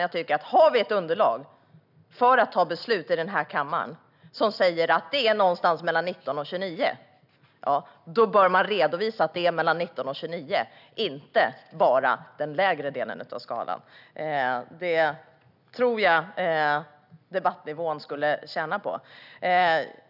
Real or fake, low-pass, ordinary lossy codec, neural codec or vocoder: real; 5.4 kHz; none; none